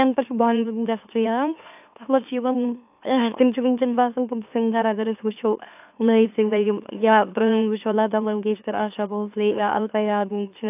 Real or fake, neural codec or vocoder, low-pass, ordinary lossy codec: fake; autoencoder, 44.1 kHz, a latent of 192 numbers a frame, MeloTTS; 3.6 kHz; AAC, 32 kbps